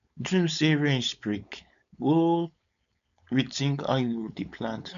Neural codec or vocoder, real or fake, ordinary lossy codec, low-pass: codec, 16 kHz, 4.8 kbps, FACodec; fake; none; 7.2 kHz